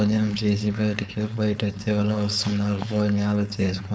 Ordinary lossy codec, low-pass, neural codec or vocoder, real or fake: none; none; codec, 16 kHz, 4.8 kbps, FACodec; fake